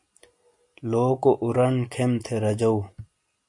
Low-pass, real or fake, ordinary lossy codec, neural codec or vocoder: 10.8 kHz; real; AAC, 64 kbps; none